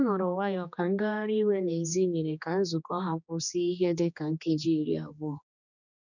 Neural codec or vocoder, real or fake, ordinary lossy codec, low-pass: codec, 16 kHz, 2 kbps, X-Codec, HuBERT features, trained on general audio; fake; none; 7.2 kHz